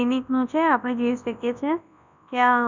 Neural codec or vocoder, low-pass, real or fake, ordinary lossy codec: codec, 24 kHz, 0.9 kbps, WavTokenizer, large speech release; 7.2 kHz; fake; none